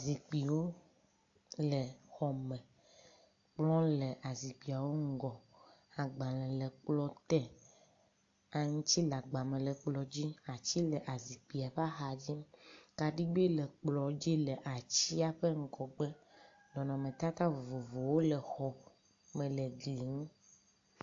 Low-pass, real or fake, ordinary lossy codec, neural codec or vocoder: 7.2 kHz; real; AAC, 48 kbps; none